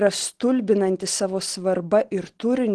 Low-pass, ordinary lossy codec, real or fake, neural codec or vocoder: 10.8 kHz; Opus, 16 kbps; real; none